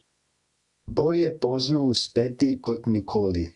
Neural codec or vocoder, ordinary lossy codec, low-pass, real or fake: codec, 24 kHz, 0.9 kbps, WavTokenizer, medium music audio release; Opus, 64 kbps; 10.8 kHz; fake